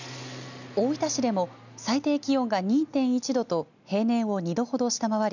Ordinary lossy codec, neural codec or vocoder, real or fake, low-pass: none; none; real; 7.2 kHz